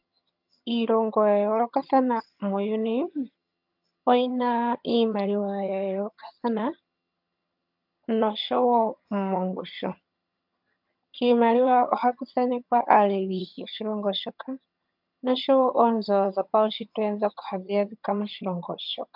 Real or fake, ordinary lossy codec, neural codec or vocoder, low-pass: fake; MP3, 48 kbps; vocoder, 22.05 kHz, 80 mel bands, HiFi-GAN; 5.4 kHz